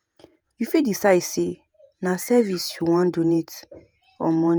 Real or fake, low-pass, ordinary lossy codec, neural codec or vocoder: fake; none; none; vocoder, 48 kHz, 128 mel bands, Vocos